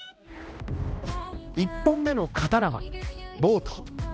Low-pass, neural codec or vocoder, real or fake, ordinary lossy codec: none; codec, 16 kHz, 1 kbps, X-Codec, HuBERT features, trained on balanced general audio; fake; none